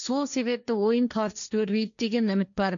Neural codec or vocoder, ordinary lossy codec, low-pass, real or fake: codec, 16 kHz, 1.1 kbps, Voila-Tokenizer; MP3, 96 kbps; 7.2 kHz; fake